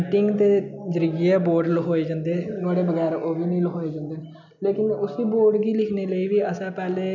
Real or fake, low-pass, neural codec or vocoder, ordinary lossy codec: real; 7.2 kHz; none; none